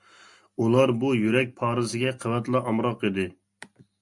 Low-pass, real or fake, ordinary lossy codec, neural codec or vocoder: 10.8 kHz; real; MP3, 64 kbps; none